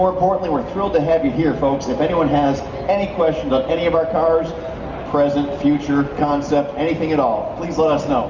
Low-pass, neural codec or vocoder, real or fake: 7.2 kHz; none; real